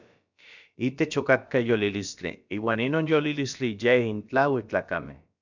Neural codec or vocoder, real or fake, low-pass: codec, 16 kHz, about 1 kbps, DyCAST, with the encoder's durations; fake; 7.2 kHz